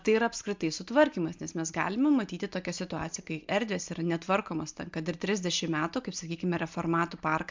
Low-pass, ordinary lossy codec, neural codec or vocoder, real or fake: 7.2 kHz; MP3, 64 kbps; none; real